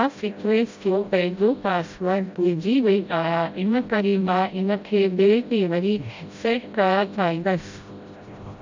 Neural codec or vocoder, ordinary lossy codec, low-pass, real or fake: codec, 16 kHz, 0.5 kbps, FreqCodec, smaller model; MP3, 64 kbps; 7.2 kHz; fake